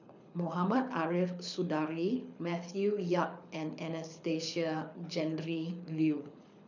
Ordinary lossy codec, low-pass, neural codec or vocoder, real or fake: none; 7.2 kHz; codec, 24 kHz, 6 kbps, HILCodec; fake